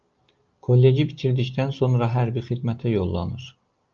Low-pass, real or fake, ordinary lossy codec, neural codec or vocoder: 7.2 kHz; real; Opus, 24 kbps; none